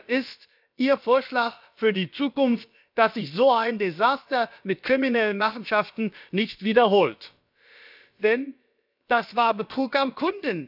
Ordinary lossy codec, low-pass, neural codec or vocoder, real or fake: none; 5.4 kHz; codec, 16 kHz, about 1 kbps, DyCAST, with the encoder's durations; fake